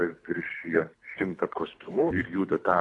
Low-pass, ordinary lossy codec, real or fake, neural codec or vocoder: 10.8 kHz; AAC, 48 kbps; fake; codec, 24 kHz, 3 kbps, HILCodec